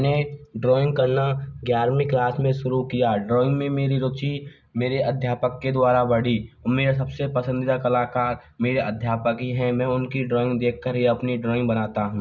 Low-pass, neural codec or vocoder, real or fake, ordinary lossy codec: 7.2 kHz; none; real; none